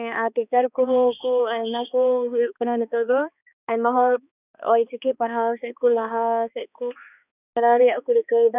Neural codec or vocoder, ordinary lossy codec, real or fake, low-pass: codec, 16 kHz, 2 kbps, X-Codec, HuBERT features, trained on balanced general audio; none; fake; 3.6 kHz